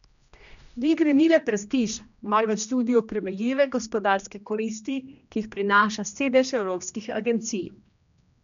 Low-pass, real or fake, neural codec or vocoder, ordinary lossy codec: 7.2 kHz; fake; codec, 16 kHz, 1 kbps, X-Codec, HuBERT features, trained on general audio; none